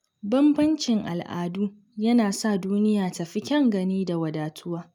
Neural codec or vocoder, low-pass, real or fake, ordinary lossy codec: none; 19.8 kHz; real; none